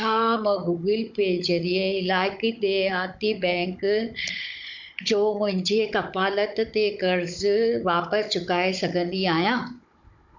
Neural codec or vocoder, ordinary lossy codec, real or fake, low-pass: codec, 16 kHz, 16 kbps, FunCodec, trained on Chinese and English, 50 frames a second; MP3, 64 kbps; fake; 7.2 kHz